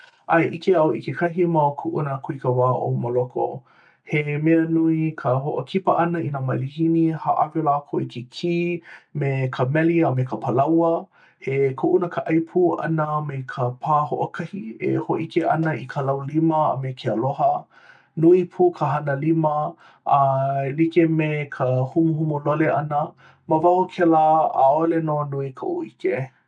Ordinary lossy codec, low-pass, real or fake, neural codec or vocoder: none; 9.9 kHz; real; none